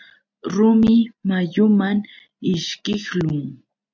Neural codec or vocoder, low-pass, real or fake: none; 7.2 kHz; real